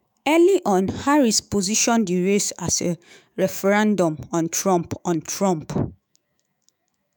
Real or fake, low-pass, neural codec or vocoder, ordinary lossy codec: fake; none; autoencoder, 48 kHz, 128 numbers a frame, DAC-VAE, trained on Japanese speech; none